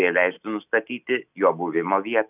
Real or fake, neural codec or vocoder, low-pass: real; none; 3.6 kHz